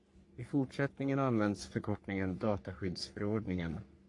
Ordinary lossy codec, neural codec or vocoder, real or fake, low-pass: AAC, 48 kbps; codec, 44.1 kHz, 3.4 kbps, Pupu-Codec; fake; 10.8 kHz